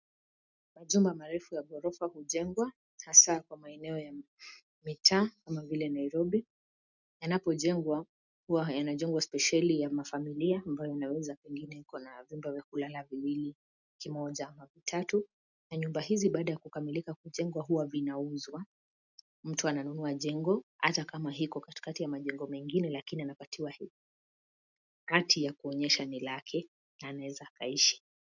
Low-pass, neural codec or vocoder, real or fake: 7.2 kHz; none; real